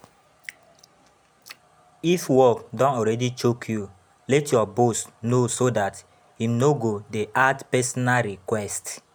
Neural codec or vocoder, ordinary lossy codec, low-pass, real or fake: none; none; none; real